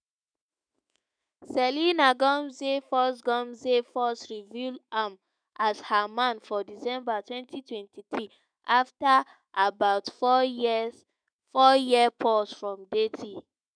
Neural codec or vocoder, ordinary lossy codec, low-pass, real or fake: autoencoder, 48 kHz, 128 numbers a frame, DAC-VAE, trained on Japanese speech; none; 9.9 kHz; fake